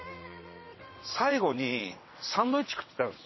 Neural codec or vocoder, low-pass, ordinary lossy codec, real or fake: vocoder, 22.05 kHz, 80 mel bands, WaveNeXt; 7.2 kHz; MP3, 24 kbps; fake